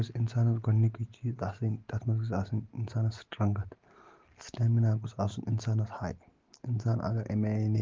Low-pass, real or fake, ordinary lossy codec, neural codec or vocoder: 7.2 kHz; real; Opus, 16 kbps; none